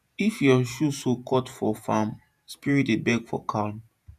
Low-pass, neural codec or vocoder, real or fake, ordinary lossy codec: 14.4 kHz; vocoder, 48 kHz, 128 mel bands, Vocos; fake; none